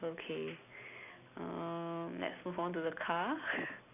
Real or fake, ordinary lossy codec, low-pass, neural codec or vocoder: real; none; 3.6 kHz; none